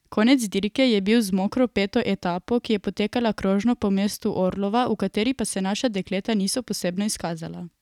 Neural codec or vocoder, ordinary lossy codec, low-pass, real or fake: none; none; 19.8 kHz; real